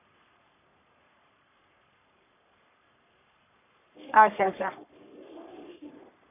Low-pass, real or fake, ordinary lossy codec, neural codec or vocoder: 3.6 kHz; fake; none; codec, 44.1 kHz, 3.4 kbps, Pupu-Codec